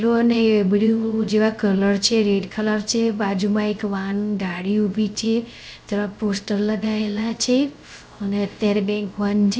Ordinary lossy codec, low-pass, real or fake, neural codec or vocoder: none; none; fake; codec, 16 kHz, 0.3 kbps, FocalCodec